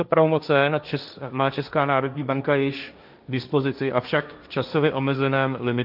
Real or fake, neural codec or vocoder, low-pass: fake; codec, 16 kHz, 1.1 kbps, Voila-Tokenizer; 5.4 kHz